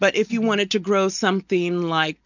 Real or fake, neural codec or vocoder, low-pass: real; none; 7.2 kHz